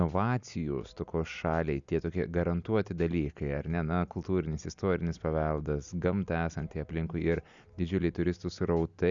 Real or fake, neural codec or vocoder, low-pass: real; none; 7.2 kHz